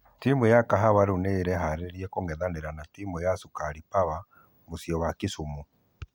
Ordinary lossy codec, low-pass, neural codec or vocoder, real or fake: none; 19.8 kHz; none; real